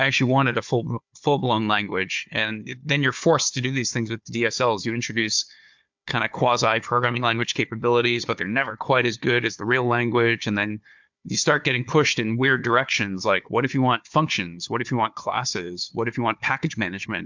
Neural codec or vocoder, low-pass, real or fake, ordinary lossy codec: codec, 16 kHz, 4 kbps, FunCodec, trained on Chinese and English, 50 frames a second; 7.2 kHz; fake; MP3, 64 kbps